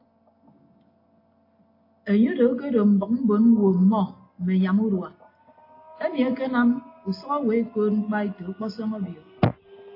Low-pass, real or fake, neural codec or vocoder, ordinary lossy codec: 5.4 kHz; real; none; AAC, 32 kbps